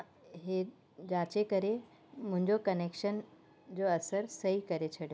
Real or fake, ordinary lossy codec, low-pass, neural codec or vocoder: real; none; none; none